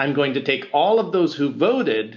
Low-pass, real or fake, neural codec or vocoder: 7.2 kHz; real; none